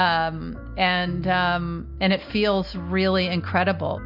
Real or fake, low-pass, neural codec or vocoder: real; 5.4 kHz; none